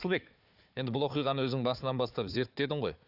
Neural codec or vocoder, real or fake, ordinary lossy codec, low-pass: codec, 16 kHz, 4 kbps, FunCodec, trained on Chinese and English, 50 frames a second; fake; none; 5.4 kHz